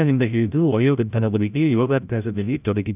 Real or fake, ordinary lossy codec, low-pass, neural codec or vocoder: fake; none; 3.6 kHz; codec, 16 kHz, 0.5 kbps, FreqCodec, larger model